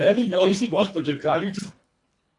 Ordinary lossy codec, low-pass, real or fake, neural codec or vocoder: AAC, 48 kbps; 10.8 kHz; fake; codec, 24 kHz, 1.5 kbps, HILCodec